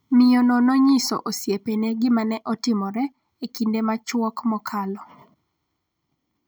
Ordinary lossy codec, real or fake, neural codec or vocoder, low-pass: none; real; none; none